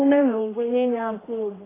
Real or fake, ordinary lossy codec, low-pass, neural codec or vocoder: fake; AAC, 16 kbps; 3.6 kHz; codec, 16 kHz, 1 kbps, X-Codec, HuBERT features, trained on general audio